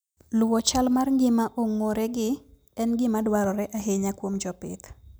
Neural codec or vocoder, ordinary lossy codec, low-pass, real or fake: none; none; none; real